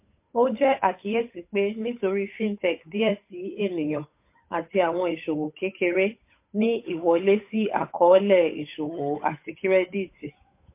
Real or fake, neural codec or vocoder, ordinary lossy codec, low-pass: fake; codec, 16 kHz, 8 kbps, FunCodec, trained on Chinese and English, 25 frames a second; MP3, 24 kbps; 3.6 kHz